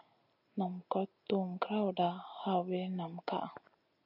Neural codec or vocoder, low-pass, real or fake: none; 5.4 kHz; real